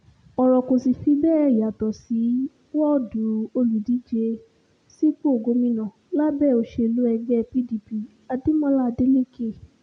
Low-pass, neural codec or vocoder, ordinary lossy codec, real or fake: 9.9 kHz; none; none; real